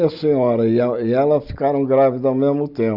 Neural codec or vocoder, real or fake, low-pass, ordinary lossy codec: none; real; 5.4 kHz; Opus, 64 kbps